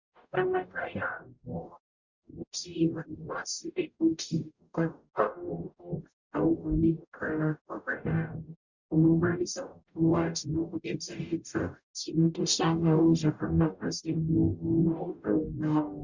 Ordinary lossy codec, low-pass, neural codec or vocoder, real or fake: Opus, 64 kbps; 7.2 kHz; codec, 44.1 kHz, 0.9 kbps, DAC; fake